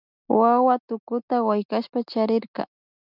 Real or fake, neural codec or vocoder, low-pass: real; none; 5.4 kHz